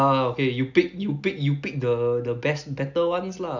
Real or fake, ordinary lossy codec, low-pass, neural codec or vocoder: real; none; 7.2 kHz; none